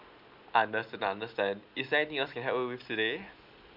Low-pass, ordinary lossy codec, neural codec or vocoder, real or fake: 5.4 kHz; none; none; real